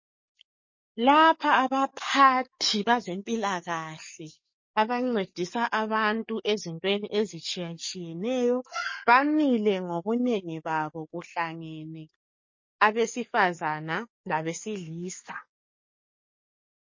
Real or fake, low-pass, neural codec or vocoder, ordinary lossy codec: fake; 7.2 kHz; codec, 44.1 kHz, 7.8 kbps, DAC; MP3, 32 kbps